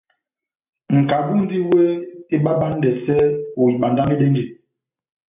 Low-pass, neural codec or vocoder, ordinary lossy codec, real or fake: 3.6 kHz; none; AAC, 32 kbps; real